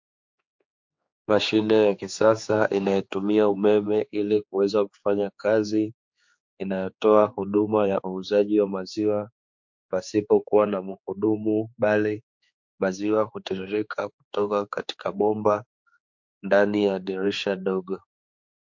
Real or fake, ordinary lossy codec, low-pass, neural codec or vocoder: fake; MP3, 48 kbps; 7.2 kHz; codec, 16 kHz, 4 kbps, X-Codec, HuBERT features, trained on general audio